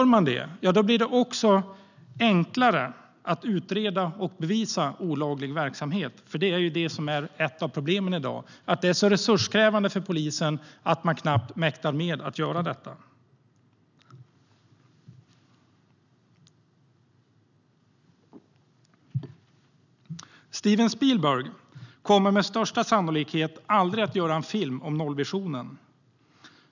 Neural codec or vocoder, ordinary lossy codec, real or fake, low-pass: none; none; real; 7.2 kHz